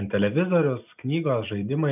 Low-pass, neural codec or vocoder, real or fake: 3.6 kHz; none; real